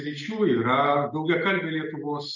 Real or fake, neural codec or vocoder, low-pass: real; none; 7.2 kHz